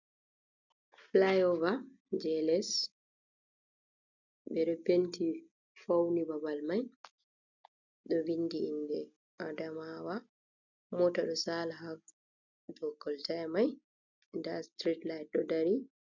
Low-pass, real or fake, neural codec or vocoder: 7.2 kHz; real; none